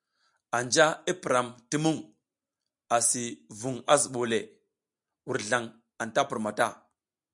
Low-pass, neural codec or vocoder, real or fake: 10.8 kHz; none; real